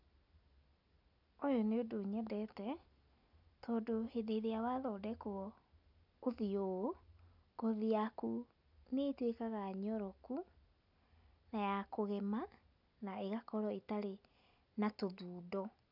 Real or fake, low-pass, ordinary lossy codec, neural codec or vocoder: real; 5.4 kHz; none; none